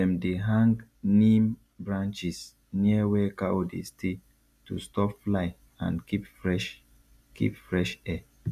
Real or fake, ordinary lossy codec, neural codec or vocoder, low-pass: real; none; none; 14.4 kHz